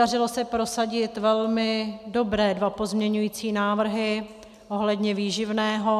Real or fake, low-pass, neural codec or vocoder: real; 14.4 kHz; none